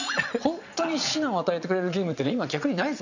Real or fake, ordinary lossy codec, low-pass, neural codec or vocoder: fake; none; 7.2 kHz; vocoder, 22.05 kHz, 80 mel bands, Vocos